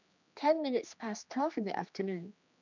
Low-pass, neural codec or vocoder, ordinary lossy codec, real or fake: 7.2 kHz; codec, 16 kHz, 2 kbps, X-Codec, HuBERT features, trained on general audio; none; fake